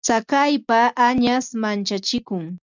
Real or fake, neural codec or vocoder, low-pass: real; none; 7.2 kHz